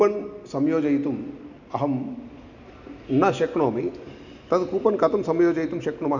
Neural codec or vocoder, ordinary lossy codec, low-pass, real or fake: none; none; 7.2 kHz; real